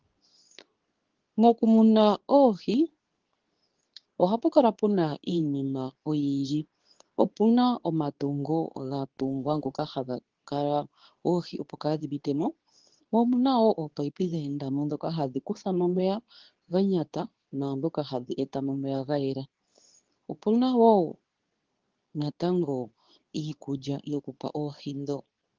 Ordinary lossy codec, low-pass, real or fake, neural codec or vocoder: Opus, 16 kbps; 7.2 kHz; fake; codec, 24 kHz, 0.9 kbps, WavTokenizer, medium speech release version 2